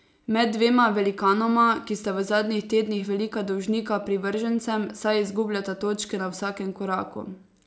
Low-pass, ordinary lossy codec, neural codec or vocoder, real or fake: none; none; none; real